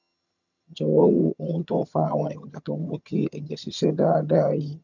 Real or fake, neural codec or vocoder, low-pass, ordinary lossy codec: fake; vocoder, 22.05 kHz, 80 mel bands, HiFi-GAN; 7.2 kHz; none